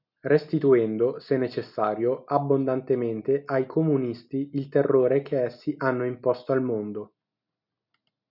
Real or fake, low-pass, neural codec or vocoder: real; 5.4 kHz; none